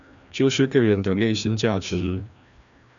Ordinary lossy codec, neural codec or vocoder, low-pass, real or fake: MP3, 96 kbps; codec, 16 kHz, 1 kbps, FreqCodec, larger model; 7.2 kHz; fake